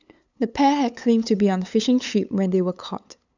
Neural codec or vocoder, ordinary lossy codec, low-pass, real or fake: codec, 16 kHz, 8 kbps, FunCodec, trained on LibriTTS, 25 frames a second; none; 7.2 kHz; fake